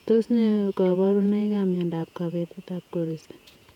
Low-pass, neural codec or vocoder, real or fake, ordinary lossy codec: 19.8 kHz; vocoder, 48 kHz, 128 mel bands, Vocos; fake; none